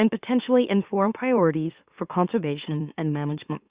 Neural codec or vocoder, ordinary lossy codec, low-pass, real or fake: autoencoder, 44.1 kHz, a latent of 192 numbers a frame, MeloTTS; Opus, 64 kbps; 3.6 kHz; fake